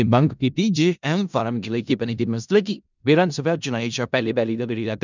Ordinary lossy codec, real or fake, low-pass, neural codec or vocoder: none; fake; 7.2 kHz; codec, 16 kHz in and 24 kHz out, 0.4 kbps, LongCat-Audio-Codec, four codebook decoder